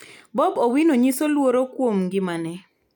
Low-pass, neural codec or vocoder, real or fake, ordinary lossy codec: 19.8 kHz; none; real; none